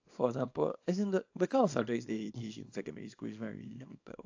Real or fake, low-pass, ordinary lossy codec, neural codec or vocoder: fake; 7.2 kHz; AAC, 48 kbps; codec, 24 kHz, 0.9 kbps, WavTokenizer, small release